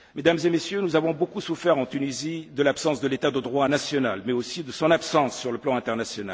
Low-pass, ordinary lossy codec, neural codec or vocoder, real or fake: none; none; none; real